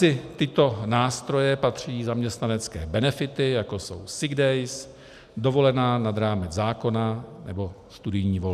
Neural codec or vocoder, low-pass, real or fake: none; 14.4 kHz; real